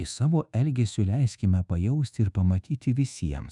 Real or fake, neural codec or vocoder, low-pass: fake; codec, 24 kHz, 1.2 kbps, DualCodec; 10.8 kHz